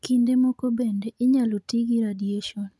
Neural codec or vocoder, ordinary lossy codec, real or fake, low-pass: none; none; real; none